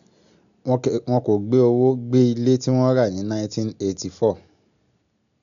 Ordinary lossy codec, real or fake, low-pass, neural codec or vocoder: none; real; 7.2 kHz; none